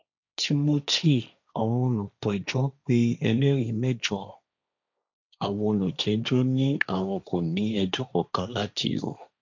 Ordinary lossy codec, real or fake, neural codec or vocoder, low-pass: none; fake; codec, 16 kHz, 1.1 kbps, Voila-Tokenizer; 7.2 kHz